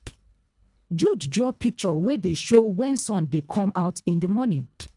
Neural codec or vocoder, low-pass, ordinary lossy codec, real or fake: codec, 24 kHz, 1.5 kbps, HILCodec; 10.8 kHz; none; fake